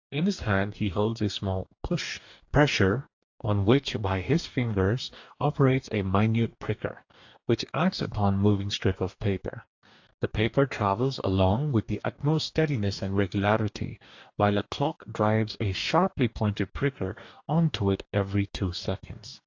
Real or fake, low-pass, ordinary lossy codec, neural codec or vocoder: fake; 7.2 kHz; AAC, 48 kbps; codec, 44.1 kHz, 2.6 kbps, DAC